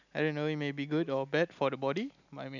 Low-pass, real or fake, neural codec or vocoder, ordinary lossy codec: 7.2 kHz; real; none; none